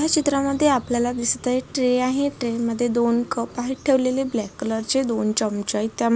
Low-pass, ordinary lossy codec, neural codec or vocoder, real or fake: none; none; none; real